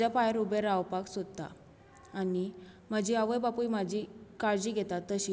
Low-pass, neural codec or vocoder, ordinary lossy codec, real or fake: none; none; none; real